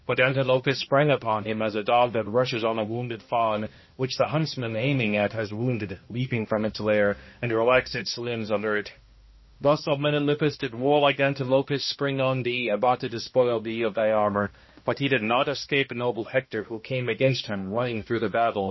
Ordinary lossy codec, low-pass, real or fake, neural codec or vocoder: MP3, 24 kbps; 7.2 kHz; fake; codec, 16 kHz, 1 kbps, X-Codec, HuBERT features, trained on balanced general audio